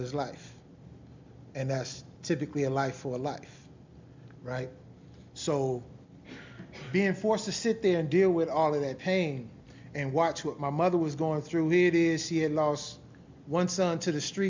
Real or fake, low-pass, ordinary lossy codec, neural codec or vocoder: real; 7.2 kHz; MP3, 64 kbps; none